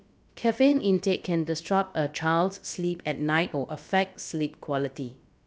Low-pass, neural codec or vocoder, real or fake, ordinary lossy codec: none; codec, 16 kHz, about 1 kbps, DyCAST, with the encoder's durations; fake; none